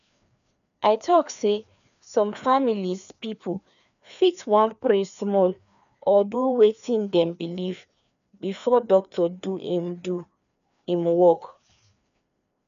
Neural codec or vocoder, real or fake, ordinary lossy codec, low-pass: codec, 16 kHz, 2 kbps, FreqCodec, larger model; fake; none; 7.2 kHz